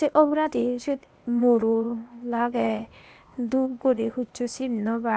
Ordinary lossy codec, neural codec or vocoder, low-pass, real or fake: none; codec, 16 kHz, 0.8 kbps, ZipCodec; none; fake